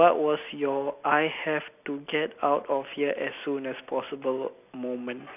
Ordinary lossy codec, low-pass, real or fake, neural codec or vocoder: none; 3.6 kHz; real; none